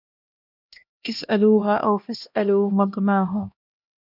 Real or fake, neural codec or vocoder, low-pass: fake; codec, 16 kHz, 1 kbps, X-Codec, HuBERT features, trained on LibriSpeech; 5.4 kHz